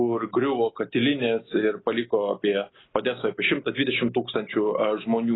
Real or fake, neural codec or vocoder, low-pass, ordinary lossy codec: real; none; 7.2 kHz; AAC, 16 kbps